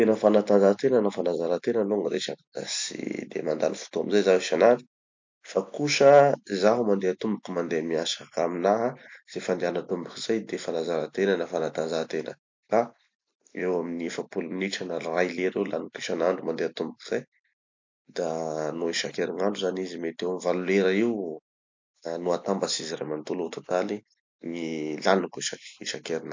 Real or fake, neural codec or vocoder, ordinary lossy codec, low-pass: real; none; MP3, 48 kbps; 7.2 kHz